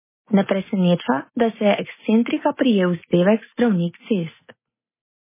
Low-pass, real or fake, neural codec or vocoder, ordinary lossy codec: 3.6 kHz; real; none; MP3, 16 kbps